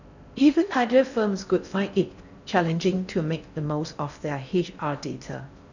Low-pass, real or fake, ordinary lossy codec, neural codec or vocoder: 7.2 kHz; fake; none; codec, 16 kHz in and 24 kHz out, 0.6 kbps, FocalCodec, streaming, 4096 codes